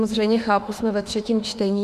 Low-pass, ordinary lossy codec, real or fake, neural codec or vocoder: 14.4 kHz; Opus, 64 kbps; fake; autoencoder, 48 kHz, 32 numbers a frame, DAC-VAE, trained on Japanese speech